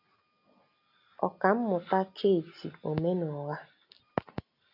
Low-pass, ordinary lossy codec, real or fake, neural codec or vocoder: 5.4 kHz; AAC, 48 kbps; real; none